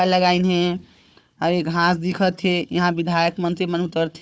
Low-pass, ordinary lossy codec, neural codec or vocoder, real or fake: none; none; codec, 16 kHz, 4 kbps, FunCodec, trained on Chinese and English, 50 frames a second; fake